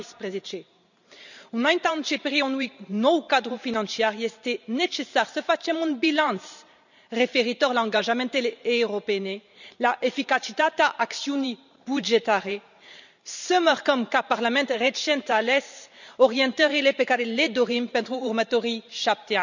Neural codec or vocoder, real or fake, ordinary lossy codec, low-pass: vocoder, 44.1 kHz, 128 mel bands every 512 samples, BigVGAN v2; fake; none; 7.2 kHz